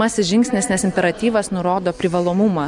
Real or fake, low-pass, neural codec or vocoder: real; 10.8 kHz; none